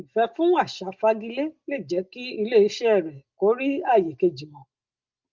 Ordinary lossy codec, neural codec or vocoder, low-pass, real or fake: Opus, 32 kbps; none; 7.2 kHz; real